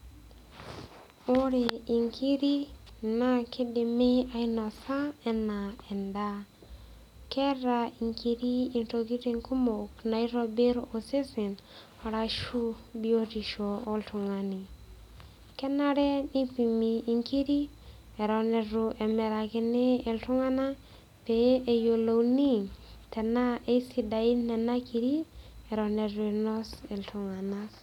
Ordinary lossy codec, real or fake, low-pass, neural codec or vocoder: none; real; 19.8 kHz; none